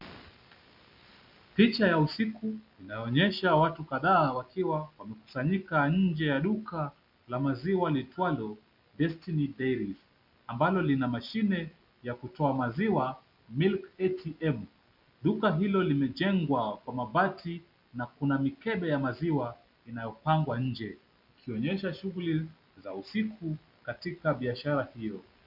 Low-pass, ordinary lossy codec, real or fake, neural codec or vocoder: 5.4 kHz; MP3, 48 kbps; real; none